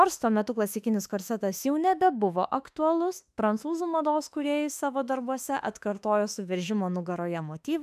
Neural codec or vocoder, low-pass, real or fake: autoencoder, 48 kHz, 32 numbers a frame, DAC-VAE, trained on Japanese speech; 14.4 kHz; fake